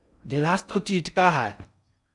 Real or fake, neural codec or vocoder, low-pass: fake; codec, 16 kHz in and 24 kHz out, 0.6 kbps, FocalCodec, streaming, 4096 codes; 10.8 kHz